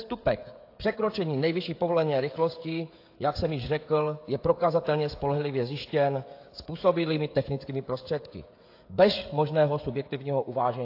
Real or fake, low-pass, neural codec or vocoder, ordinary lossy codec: fake; 5.4 kHz; codec, 16 kHz, 16 kbps, FreqCodec, smaller model; AAC, 32 kbps